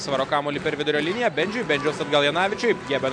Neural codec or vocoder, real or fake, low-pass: none; real; 9.9 kHz